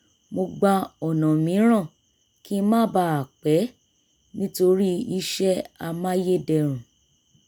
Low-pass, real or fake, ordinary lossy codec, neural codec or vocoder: none; real; none; none